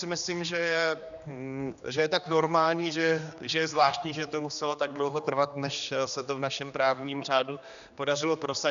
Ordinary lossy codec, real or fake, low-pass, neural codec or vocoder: MP3, 96 kbps; fake; 7.2 kHz; codec, 16 kHz, 2 kbps, X-Codec, HuBERT features, trained on general audio